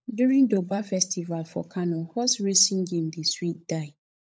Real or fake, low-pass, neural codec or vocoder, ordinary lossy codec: fake; none; codec, 16 kHz, 16 kbps, FunCodec, trained on LibriTTS, 50 frames a second; none